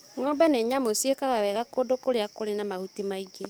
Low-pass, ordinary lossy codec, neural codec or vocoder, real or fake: none; none; codec, 44.1 kHz, 7.8 kbps, DAC; fake